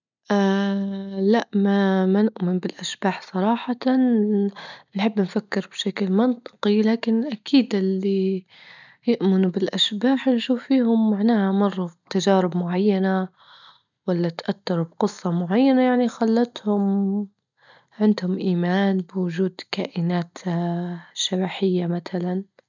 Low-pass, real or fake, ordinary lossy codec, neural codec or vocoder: 7.2 kHz; real; none; none